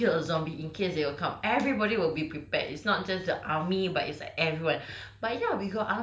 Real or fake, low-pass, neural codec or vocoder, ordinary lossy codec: real; none; none; none